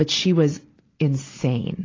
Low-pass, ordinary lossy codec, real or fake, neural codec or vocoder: 7.2 kHz; AAC, 32 kbps; real; none